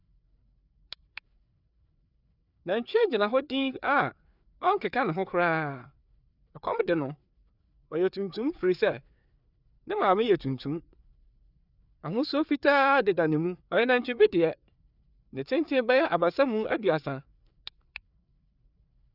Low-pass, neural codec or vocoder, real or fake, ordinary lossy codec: 5.4 kHz; codec, 16 kHz, 4 kbps, FreqCodec, larger model; fake; none